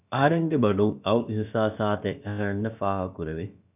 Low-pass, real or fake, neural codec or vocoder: 3.6 kHz; fake; codec, 16 kHz, about 1 kbps, DyCAST, with the encoder's durations